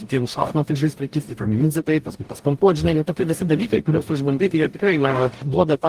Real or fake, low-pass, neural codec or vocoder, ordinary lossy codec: fake; 14.4 kHz; codec, 44.1 kHz, 0.9 kbps, DAC; Opus, 24 kbps